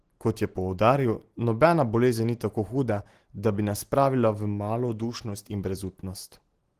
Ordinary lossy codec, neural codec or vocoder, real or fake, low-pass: Opus, 16 kbps; none; real; 14.4 kHz